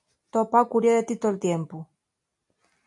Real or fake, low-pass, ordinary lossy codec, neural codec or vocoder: real; 10.8 kHz; AAC, 64 kbps; none